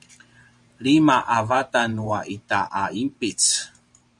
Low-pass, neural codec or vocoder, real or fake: 10.8 kHz; vocoder, 44.1 kHz, 128 mel bands every 512 samples, BigVGAN v2; fake